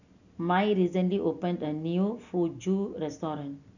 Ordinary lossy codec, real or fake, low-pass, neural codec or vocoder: none; real; 7.2 kHz; none